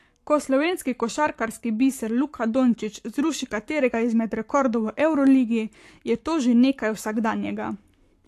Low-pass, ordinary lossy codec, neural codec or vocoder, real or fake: 14.4 kHz; AAC, 64 kbps; codec, 44.1 kHz, 7.8 kbps, Pupu-Codec; fake